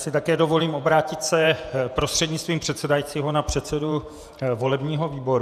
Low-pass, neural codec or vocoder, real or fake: 14.4 kHz; vocoder, 48 kHz, 128 mel bands, Vocos; fake